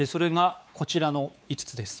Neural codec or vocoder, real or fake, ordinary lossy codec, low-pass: codec, 16 kHz, 4 kbps, X-Codec, WavLM features, trained on Multilingual LibriSpeech; fake; none; none